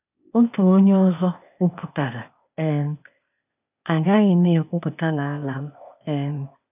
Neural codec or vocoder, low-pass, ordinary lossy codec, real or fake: codec, 16 kHz, 0.8 kbps, ZipCodec; 3.6 kHz; none; fake